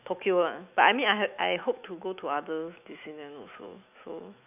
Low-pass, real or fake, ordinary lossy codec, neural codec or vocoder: 3.6 kHz; fake; none; autoencoder, 48 kHz, 128 numbers a frame, DAC-VAE, trained on Japanese speech